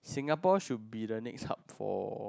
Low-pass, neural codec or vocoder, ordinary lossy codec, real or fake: none; none; none; real